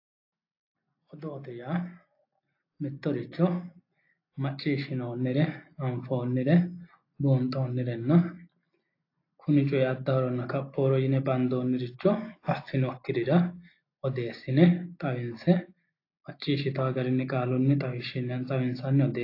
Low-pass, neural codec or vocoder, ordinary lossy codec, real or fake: 5.4 kHz; none; AAC, 32 kbps; real